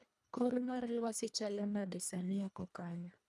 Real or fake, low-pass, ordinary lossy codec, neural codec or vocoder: fake; none; none; codec, 24 kHz, 1.5 kbps, HILCodec